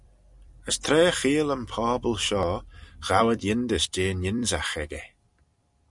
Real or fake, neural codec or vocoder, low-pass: fake; vocoder, 44.1 kHz, 128 mel bands every 256 samples, BigVGAN v2; 10.8 kHz